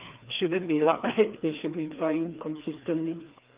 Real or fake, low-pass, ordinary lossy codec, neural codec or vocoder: fake; 3.6 kHz; Opus, 24 kbps; codec, 16 kHz, 2 kbps, FreqCodec, larger model